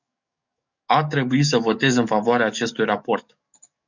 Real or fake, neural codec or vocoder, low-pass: fake; codec, 44.1 kHz, 7.8 kbps, DAC; 7.2 kHz